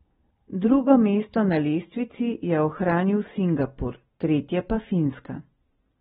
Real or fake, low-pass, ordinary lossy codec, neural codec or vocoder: real; 19.8 kHz; AAC, 16 kbps; none